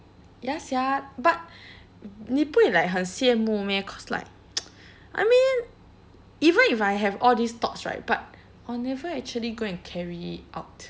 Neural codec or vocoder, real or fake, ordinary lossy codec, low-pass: none; real; none; none